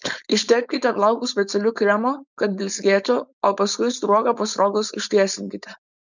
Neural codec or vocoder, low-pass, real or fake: codec, 16 kHz, 4.8 kbps, FACodec; 7.2 kHz; fake